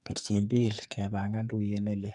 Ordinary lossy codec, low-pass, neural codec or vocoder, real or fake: none; 10.8 kHz; codec, 44.1 kHz, 2.6 kbps, SNAC; fake